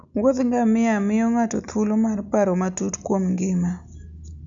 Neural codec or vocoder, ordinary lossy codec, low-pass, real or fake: none; MP3, 96 kbps; 7.2 kHz; real